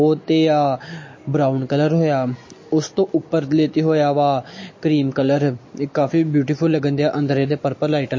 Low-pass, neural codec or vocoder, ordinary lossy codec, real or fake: 7.2 kHz; none; MP3, 32 kbps; real